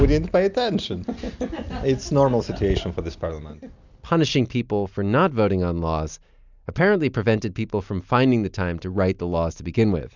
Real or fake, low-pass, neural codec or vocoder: real; 7.2 kHz; none